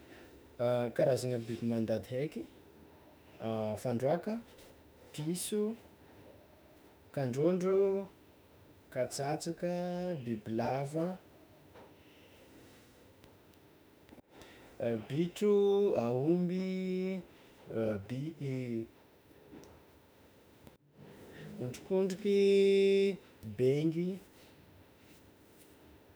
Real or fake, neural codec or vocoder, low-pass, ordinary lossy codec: fake; autoencoder, 48 kHz, 32 numbers a frame, DAC-VAE, trained on Japanese speech; none; none